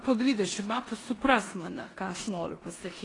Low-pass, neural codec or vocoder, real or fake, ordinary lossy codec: 10.8 kHz; codec, 16 kHz in and 24 kHz out, 0.9 kbps, LongCat-Audio-Codec, four codebook decoder; fake; AAC, 32 kbps